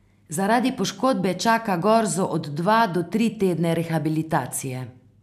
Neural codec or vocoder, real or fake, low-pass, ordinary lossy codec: none; real; 14.4 kHz; none